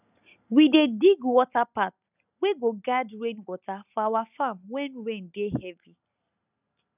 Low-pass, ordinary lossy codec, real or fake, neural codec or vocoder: 3.6 kHz; none; real; none